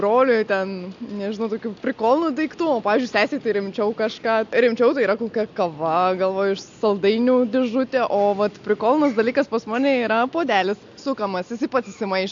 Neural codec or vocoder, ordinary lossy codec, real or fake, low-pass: none; AAC, 64 kbps; real; 7.2 kHz